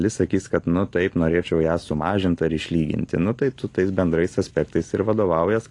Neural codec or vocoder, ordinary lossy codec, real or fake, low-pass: none; AAC, 48 kbps; real; 10.8 kHz